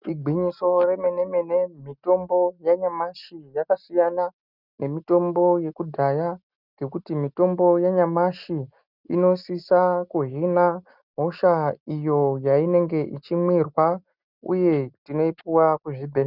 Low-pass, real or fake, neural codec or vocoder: 5.4 kHz; real; none